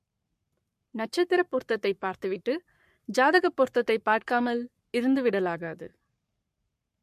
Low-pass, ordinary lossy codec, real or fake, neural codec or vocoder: 14.4 kHz; MP3, 64 kbps; fake; codec, 44.1 kHz, 7.8 kbps, Pupu-Codec